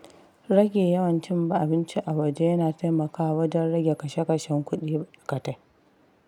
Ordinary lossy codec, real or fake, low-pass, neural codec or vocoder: none; real; 19.8 kHz; none